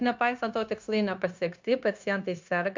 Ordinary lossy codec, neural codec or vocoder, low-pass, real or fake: MP3, 64 kbps; codec, 16 kHz, 0.9 kbps, LongCat-Audio-Codec; 7.2 kHz; fake